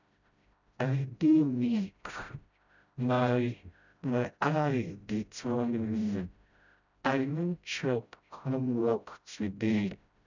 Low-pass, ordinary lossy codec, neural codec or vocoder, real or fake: 7.2 kHz; none; codec, 16 kHz, 0.5 kbps, FreqCodec, smaller model; fake